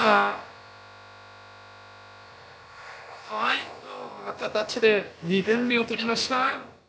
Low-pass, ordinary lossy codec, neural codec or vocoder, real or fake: none; none; codec, 16 kHz, about 1 kbps, DyCAST, with the encoder's durations; fake